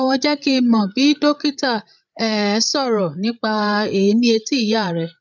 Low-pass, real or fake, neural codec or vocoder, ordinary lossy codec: 7.2 kHz; fake; codec, 16 kHz, 8 kbps, FreqCodec, larger model; none